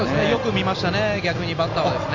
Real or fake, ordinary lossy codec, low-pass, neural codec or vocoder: real; AAC, 48 kbps; 7.2 kHz; none